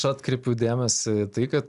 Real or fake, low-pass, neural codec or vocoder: real; 10.8 kHz; none